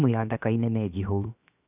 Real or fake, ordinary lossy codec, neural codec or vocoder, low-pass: fake; none; codec, 16 kHz, 0.7 kbps, FocalCodec; 3.6 kHz